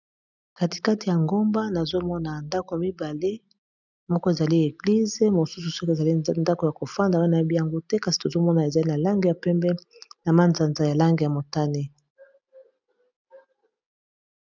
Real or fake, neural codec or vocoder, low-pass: real; none; 7.2 kHz